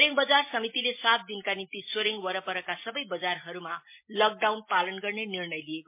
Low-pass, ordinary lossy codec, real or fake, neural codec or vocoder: 3.6 kHz; MP3, 24 kbps; real; none